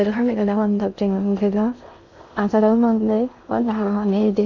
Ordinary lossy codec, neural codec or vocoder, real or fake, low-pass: none; codec, 16 kHz in and 24 kHz out, 0.6 kbps, FocalCodec, streaming, 2048 codes; fake; 7.2 kHz